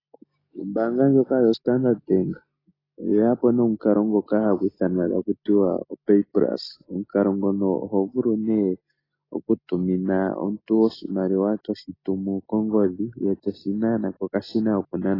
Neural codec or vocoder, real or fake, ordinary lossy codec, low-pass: none; real; AAC, 24 kbps; 5.4 kHz